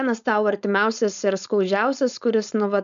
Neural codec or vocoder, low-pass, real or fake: codec, 16 kHz, 4.8 kbps, FACodec; 7.2 kHz; fake